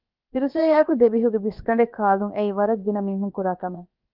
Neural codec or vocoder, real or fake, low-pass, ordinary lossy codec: codec, 16 kHz, about 1 kbps, DyCAST, with the encoder's durations; fake; 5.4 kHz; Opus, 24 kbps